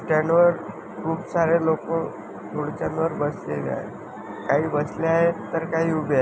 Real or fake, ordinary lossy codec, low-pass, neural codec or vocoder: real; none; none; none